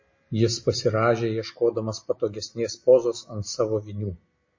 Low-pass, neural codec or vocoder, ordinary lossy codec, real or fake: 7.2 kHz; none; MP3, 32 kbps; real